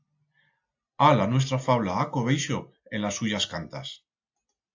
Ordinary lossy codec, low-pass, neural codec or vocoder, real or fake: AAC, 48 kbps; 7.2 kHz; none; real